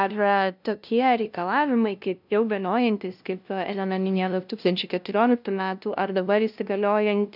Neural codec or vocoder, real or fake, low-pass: codec, 16 kHz, 0.5 kbps, FunCodec, trained on LibriTTS, 25 frames a second; fake; 5.4 kHz